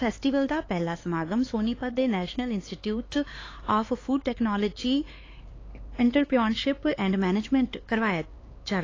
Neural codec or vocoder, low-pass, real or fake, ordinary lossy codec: codec, 16 kHz, 4 kbps, X-Codec, HuBERT features, trained on LibriSpeech; 7.2 kHz; fake; AAC, 32 kbps